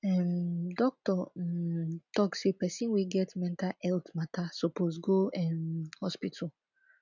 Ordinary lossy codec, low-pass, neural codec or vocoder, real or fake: none; 7.2 kHz; none; real